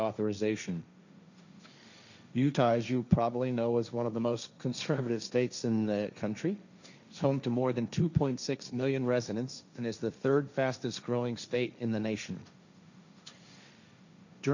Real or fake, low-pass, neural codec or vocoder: fake; 7.2 kHz; codec, 16 kHz, 1.1 kbps, Voila-Tokenizer